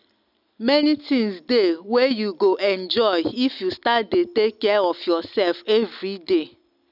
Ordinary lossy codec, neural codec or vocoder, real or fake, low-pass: none; none; real; 5.4 kHz